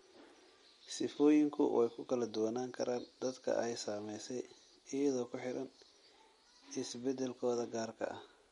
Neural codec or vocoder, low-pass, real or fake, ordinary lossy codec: none; 19.8 kHz; real; MP3, 48 kbps